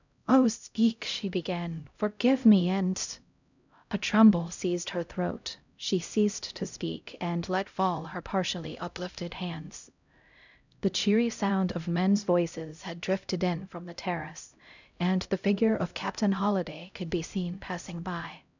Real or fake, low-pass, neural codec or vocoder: fake; 7.2 kHz; codec, 16 kHz, 0.5 kbps, X-Codec, HuBERT features, trained on LibriSpeech